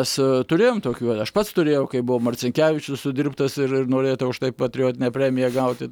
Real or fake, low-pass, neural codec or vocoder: real; 19.8 kHz; none